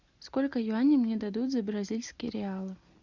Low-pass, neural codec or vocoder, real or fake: 7.2 kHz; none; real